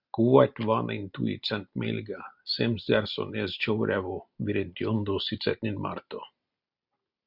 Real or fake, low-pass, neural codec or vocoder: real; 5.4 kHz; none